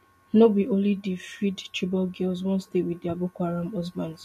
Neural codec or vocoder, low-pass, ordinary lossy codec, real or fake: none; 14.4 kHz; MP3, 64 kbps; real